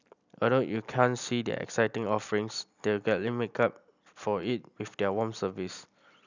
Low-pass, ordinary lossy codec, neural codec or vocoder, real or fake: 7.2 kHz; none; none; real